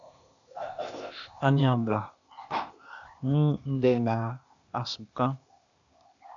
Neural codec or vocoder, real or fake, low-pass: codec, 16 kHz, 0.8 kbps, ZipCodec; fake; 7.2 kHz